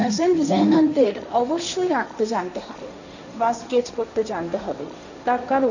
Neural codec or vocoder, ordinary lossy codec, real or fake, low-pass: codec, 16 kHz, 1.1 kbps, Voila-Tokenizer; none; fake; 7.2 kHz